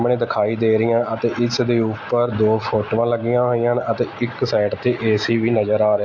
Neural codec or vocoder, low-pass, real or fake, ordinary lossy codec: none; 7.2 kHz; real; MP3, 48 kbps